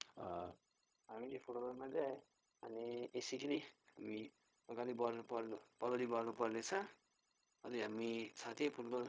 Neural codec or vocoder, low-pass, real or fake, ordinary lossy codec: codec, 16 kHz, 0.4 kbps, LongCat-Audio-Codec; none; fake; none